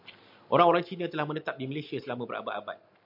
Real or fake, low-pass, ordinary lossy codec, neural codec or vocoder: real; 5.4 kHz; MP3, 48 kbps; none